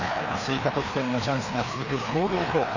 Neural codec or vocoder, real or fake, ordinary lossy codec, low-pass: codec, 16 kHz, 2 kbps, FreqCodec, larger model; fake; none; 7.2 kHz